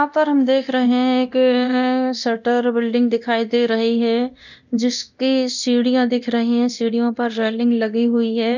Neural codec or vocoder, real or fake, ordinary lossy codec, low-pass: codec, 24 kHz, 0.9 kbps, DualCodec; fake; none; 7.2 kHz